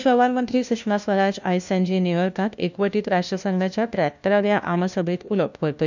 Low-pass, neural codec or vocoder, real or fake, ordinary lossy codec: 7.2 kHz; codec, 16 kHz, 1 kbps, FunCodec, trained on LibriTTS, 50 frames a second; fake; none